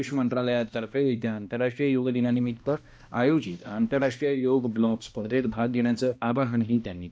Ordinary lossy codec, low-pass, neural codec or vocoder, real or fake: none; none; codec, 16 kHz, 1 kbps, X-Codec, HuBERT features, trained on balanced general audio; fake